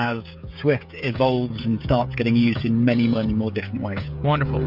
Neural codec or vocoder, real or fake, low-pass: codec, 16 kHz, 16 kbps, FreqCodec, smaller model; fake; 5.4 kHz